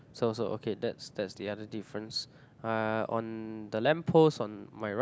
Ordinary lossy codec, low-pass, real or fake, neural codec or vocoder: none; none; real; none